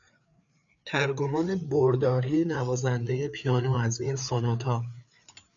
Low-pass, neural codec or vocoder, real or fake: 7.2 kHz; codec, 16 kHz, 4 kbps, FreqCodec, larger model; fake